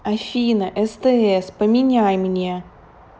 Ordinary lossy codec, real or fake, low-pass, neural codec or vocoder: none; real; none; none